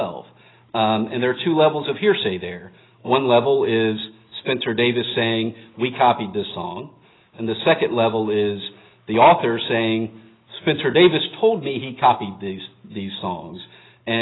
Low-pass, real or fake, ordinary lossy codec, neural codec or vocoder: 7.2 kHz; real; AAC, 16 kbps; none